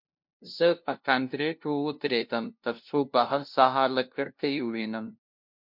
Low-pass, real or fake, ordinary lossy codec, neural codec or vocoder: 5.4 kHz; fake; MP3, 48 kbps; codec, 16 kHz, 0.5 kbps, FunCodec, trained on LibriTTS, 25 frames a second